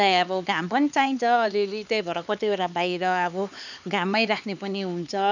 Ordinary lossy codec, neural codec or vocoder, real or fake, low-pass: none; codec, 16 kHz, 4 kbps, X-Codec, HuBERT features, trained on LibriSpeech; fake; 7.2 kHz